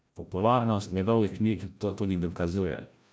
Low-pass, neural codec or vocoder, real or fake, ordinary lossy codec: none; codec, 16 kHz, 0.5 kbps, FreqCodec, larger model; fake; none